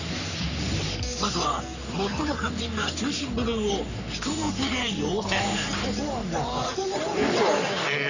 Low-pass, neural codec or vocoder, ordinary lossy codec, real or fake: 7.2 kHz; codec, 44.1 kHz, 3.4 kbps, Pupu-Codec; none; fake